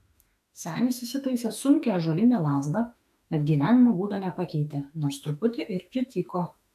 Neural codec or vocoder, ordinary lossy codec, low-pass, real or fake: autoencoder, 48 kHz, 32 numbers a frame, DAC-VAE, trained on Japanese speech; AAC, 96 kbps; 14.4 kHz; fake